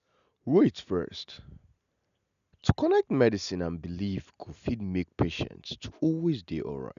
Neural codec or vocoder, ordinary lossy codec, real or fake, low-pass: none; none; real; 7.2 kHz